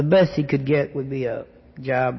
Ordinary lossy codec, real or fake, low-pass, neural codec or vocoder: MP3, 24 kbps; real; 7.2 kHz; none